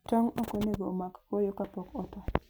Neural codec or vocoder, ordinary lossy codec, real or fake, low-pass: none; none; real; none